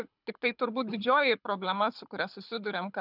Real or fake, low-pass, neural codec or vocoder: fake; 5.4 kHz; codec, 24 kHz, 6 kbps, HILCodec